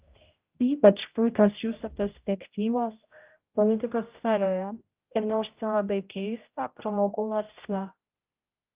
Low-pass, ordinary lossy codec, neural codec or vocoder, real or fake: 3.6 kHz; Opus, 24 kbps; codec, 16 kHz, 0.5 kbps, X-Codec, HuBERT features, trained on general audio; fake